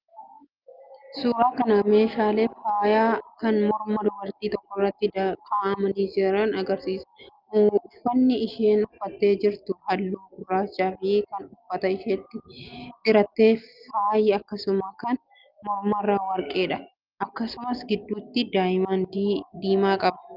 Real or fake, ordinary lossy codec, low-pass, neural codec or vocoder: real; Opus, 32 kbps; 5.4 kHz; none